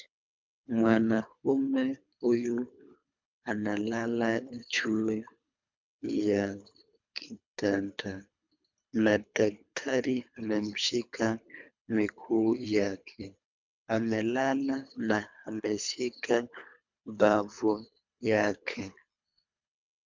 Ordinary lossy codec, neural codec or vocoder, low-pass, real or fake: MP3, 64 kbps; codec, 24 kHz, 3 kbps, HILCodec; 7.2 kHz; fake